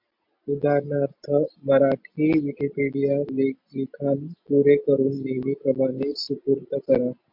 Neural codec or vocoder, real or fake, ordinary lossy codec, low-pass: none; real; MP3, 48 kbps; 5.4 kHz